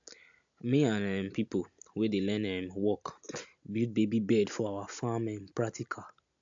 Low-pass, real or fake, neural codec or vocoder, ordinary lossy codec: 7.2 kHz; real; none; AAC, 64 kbps